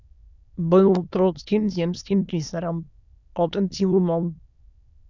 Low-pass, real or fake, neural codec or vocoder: 7.2 kHz; fake; autoencoder, 22.05 kHz, a latent of 192 numbers a frame, VITS, trained on many speakers